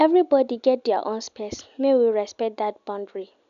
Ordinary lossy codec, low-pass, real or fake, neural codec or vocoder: none; 7.2 kHz; real; none